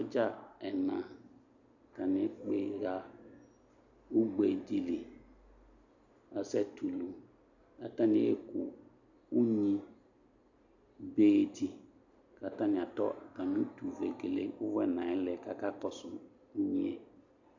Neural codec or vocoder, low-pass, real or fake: none; 7.2 kHz; real